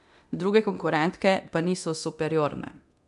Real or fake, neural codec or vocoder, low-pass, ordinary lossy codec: fake; codec, 24 kHz, 0.9 kbps, DualCodec; 10.8 kHz; none